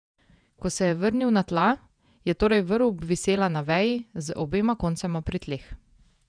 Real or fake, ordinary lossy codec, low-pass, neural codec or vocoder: fake; none; 9.9 kHz; vocoder, 48 kHz, 128 mel bands, Vocos